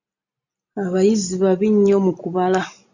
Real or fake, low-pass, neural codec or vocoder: real; 7.2 kHz; none